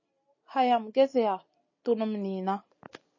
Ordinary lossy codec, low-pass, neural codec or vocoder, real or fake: MP3, 32 kbps; 7.2 kHz; none; real